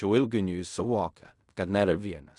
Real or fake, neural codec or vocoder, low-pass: fake; codec, 16 kHz in and 24 kHz out, 0.4 kbps, LongCat-Audio-Codec, fine tuned four codebook decoder; 10.8 kHz